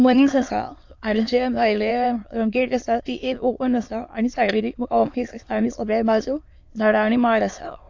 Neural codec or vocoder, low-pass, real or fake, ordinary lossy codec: autoencoder, 22.05 kHz, a latent of 192 numbers a frame, VITS, trained on many speakers; 7.2 kHz; fake; AAC, 48 kbps